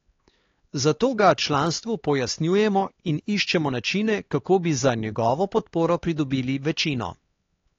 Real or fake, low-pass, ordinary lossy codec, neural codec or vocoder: fake; 7.2 kHz; AAC, 32 kbps; codec, 16 kHz, 4 kbps, X-Codec, HuBERT features, trained on LibriSpeech